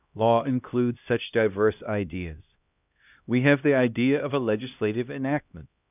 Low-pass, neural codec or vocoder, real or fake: 3.6 kHz; codec, 16 kHz, 1 kbps, X-Codec, HuBERT features, trained on LibriSpeech; fake